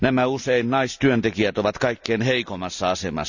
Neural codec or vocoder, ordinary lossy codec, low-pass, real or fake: none; none; 7.2 kHz; real